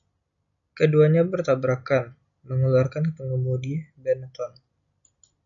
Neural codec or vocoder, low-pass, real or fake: none; 7.2 kHz; real